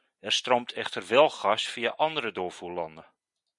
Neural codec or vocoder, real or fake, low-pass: none; real; 10.8 kHz